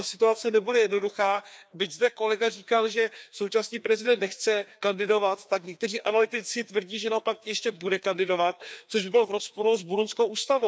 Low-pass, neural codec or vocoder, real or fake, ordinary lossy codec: none; codec, 16 kHz, 2 kbps, FreqCodec, larger model; fake; none